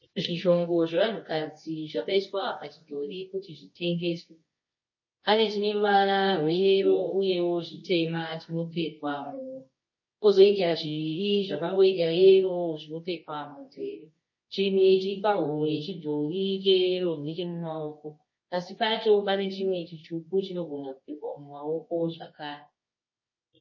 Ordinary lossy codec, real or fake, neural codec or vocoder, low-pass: MP3, 32 kbps; fake; codec, 24 kHz, 0.9 kbps, WavTokenizer, medium music audio release; 7.2 kHz